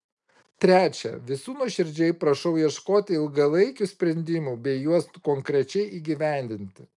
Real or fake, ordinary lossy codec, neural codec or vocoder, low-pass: real; MP3, 96 kbps; none; 10.8 kHz